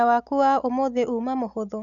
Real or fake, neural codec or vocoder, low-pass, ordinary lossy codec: real; none; 7.2 kHz; none